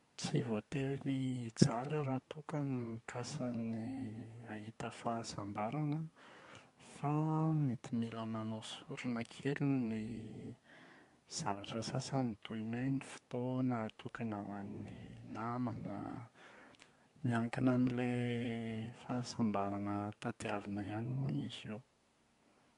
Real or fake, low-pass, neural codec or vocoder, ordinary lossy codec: fake; 10.8 kHz; codec, 24 kHz, 1 kbps, SNAC; MP3, 64 kbps